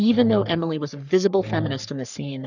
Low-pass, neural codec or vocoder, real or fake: 7.2 kHz; codec, 44.1 kHz, 3.4 kbps, Pupu-Codec; fake